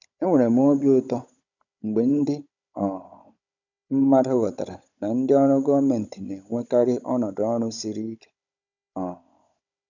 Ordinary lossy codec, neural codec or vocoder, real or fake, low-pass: none; codec, 16 kHz, 16 kbps, FunCodec, trained on Chinese and English, 50 frames a second; fake; 7.2 kHz